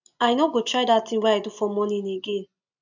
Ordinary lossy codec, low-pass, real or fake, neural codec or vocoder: none; 7.2 kHz; real; none